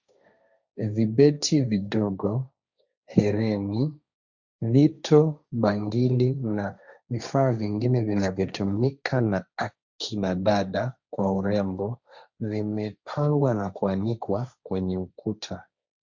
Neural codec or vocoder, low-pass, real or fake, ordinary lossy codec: codec, 16 kHz, 1.1 kbps, Voila-Tokenizer; 7.2 kHz; fake; Opus, 64 kbps